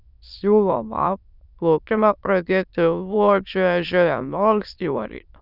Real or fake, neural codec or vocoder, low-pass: fake; autoencoder, 22.05 kHz, a latent of 192 numbers a frame, VITS, trained on many speakers; 5.4 kHz